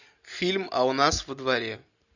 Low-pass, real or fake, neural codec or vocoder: 7.2 kHz; real; none